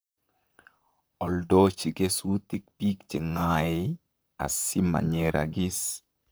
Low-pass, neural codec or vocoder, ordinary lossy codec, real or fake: none; vocoder, 44.1 kHz, 128 mel bands, Pupu-Vocoder; none; fake